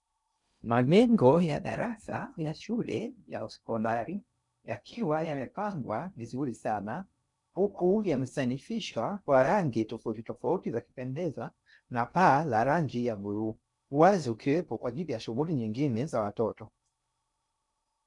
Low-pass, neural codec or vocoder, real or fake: 10.8 kHz; codec, 16 kHz in and 24 kHz out, 0.6 kbps, FocalCodec, streaming, 2048 codes; fake